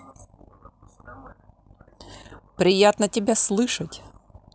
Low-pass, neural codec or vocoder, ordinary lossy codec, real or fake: none; none; none; real